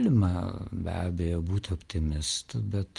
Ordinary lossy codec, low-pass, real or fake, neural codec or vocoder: Opus, 24 kbps; 10.8 kHz; real; none